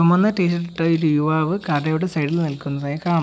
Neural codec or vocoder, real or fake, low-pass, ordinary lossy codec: none; real; none; none